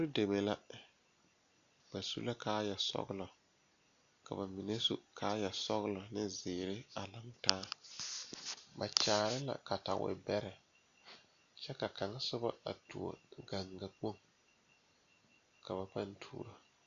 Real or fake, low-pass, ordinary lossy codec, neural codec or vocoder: real; 7.2 kHz; MP3, 96 kbps; none